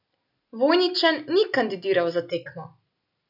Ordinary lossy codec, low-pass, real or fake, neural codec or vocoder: none; 5.4 kHz; real; none